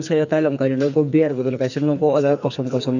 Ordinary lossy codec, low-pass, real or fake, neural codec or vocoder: none; 7.2 kHz; fake; codec, 44.1 kHz, 2.6 kbps, SNAC